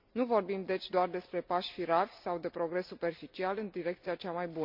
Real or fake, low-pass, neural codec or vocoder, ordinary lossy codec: real; 5.4 kHz; none; MP3, 48 kbps